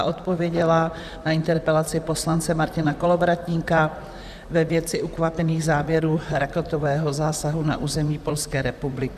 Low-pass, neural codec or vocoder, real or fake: 14.4 kHz; vocoder, 44.1 kHz, 128 mel bands, Pupu-Vocoder; fake